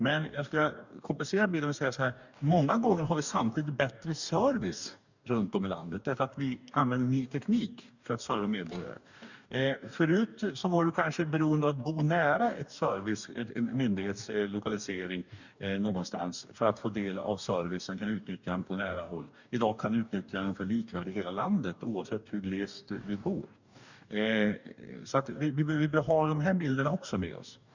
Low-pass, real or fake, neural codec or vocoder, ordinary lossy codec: 7.2 kHz; fake; codec, 44.1 kHz, 2.6 kbps, DAC; none